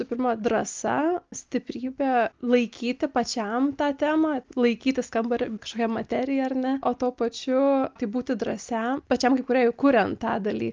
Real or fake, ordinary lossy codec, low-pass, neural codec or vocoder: real; Opus, 24 kbps; 7.2 kHz; none